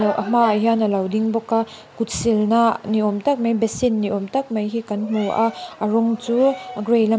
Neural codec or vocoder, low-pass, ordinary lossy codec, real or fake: none; none; none; real